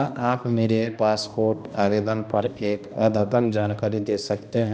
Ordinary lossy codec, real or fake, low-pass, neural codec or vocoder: none; fake; none; codec, 16 kHz, 1 kbps, X-Codec, HuBERT features, trained on balanced general audio